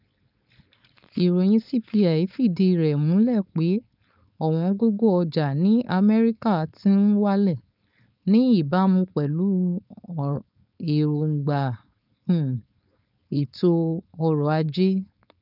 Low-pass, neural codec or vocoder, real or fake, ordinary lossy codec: 5.4 kHz; codec, 16 kHz, 4.8 kbps, FACodec; fake; none